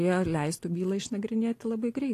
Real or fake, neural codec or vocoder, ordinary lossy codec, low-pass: real; none; AAC, 48 kbps; 14.4 kHz